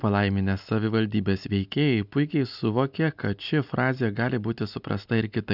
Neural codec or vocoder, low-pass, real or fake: none; 5.4 kHz; real